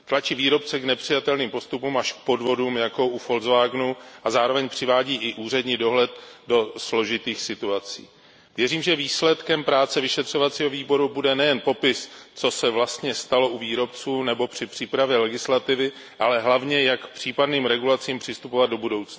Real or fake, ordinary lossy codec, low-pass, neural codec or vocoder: real; none; none; none